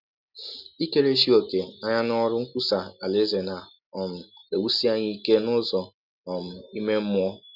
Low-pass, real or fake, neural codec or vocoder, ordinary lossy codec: 5.4 kHz; real; none; none